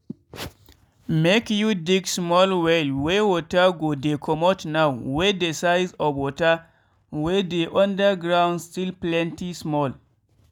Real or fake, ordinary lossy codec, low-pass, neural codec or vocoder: real; none; 19.8 kHz; none